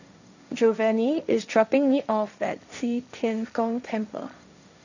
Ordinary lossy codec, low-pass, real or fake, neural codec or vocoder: none; 7.2 kHz; fake; codec, 16 kHz, 1.1 kbps, Voila-Tokenizer